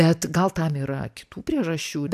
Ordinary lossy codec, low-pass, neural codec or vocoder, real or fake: AAC, 96 kbps; 14.4 kHz; none; real